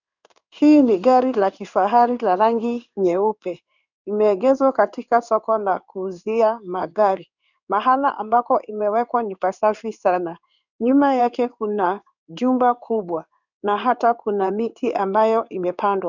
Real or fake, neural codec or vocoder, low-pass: fake; codec, 16 kHz in and 24 kHz out, 1 kbps, XY-Tokenizer; 7.2 kHz